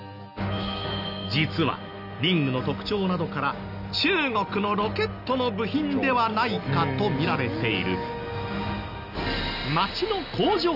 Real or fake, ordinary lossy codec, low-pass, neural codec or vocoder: real; none; 5.4 kHz; none